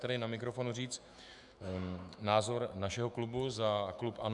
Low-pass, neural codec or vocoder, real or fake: 10.8 kHz; autoencoder, 48 kHz, 128 numbers a frame, DAC-VAE, trained on Japanese speech; fake